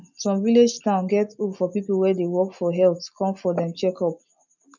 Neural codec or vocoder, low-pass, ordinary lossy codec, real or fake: none; 7.2 kHz; none; real